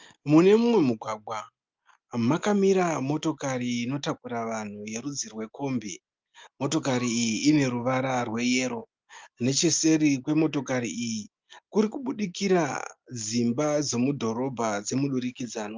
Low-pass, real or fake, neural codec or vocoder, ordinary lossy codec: 7.2 kHz; real; none; Opus, 32 kbps